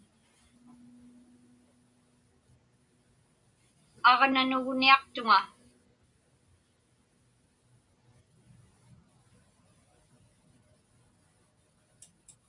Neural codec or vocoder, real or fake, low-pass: none; real; 10.8 kHz